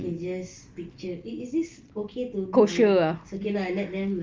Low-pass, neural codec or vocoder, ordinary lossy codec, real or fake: 7.2 kHz; none; Opus, 32 kbps; real